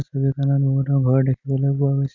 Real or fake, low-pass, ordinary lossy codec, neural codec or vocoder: real; 7.2 kHz; none; none